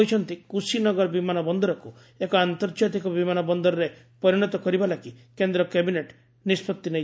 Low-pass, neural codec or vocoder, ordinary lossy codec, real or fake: none; none; none; real